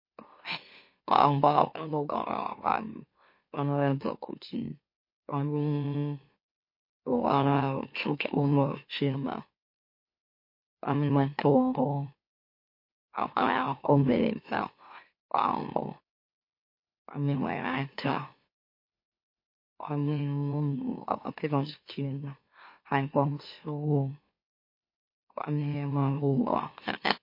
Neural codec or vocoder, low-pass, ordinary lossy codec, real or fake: autoencoder, 44.1 kHz, a latent of 192 numbers a frame, MeloTTS; 5.4 kHz; MP3, 32 kbps; fake